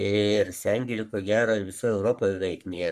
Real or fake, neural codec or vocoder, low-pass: fake; codec, 44.1 kHz, 3.4 kbps, Pupu-Codec; 14.4 kHz